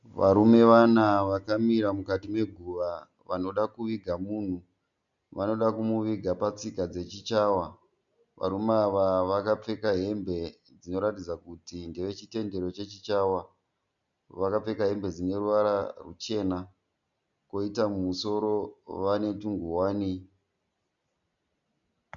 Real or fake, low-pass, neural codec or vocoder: real; 7.2 kHz; none